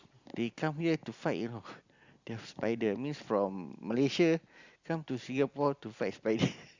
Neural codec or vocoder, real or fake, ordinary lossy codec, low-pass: none; real; Opus, 64 kbps; 7.2 kHz